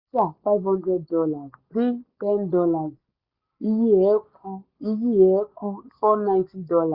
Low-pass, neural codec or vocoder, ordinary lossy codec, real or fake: 5.4 kHz; none; Opus, 64 kbps; real